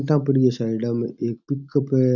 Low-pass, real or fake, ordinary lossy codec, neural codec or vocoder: 7.2 kHz; real; none; none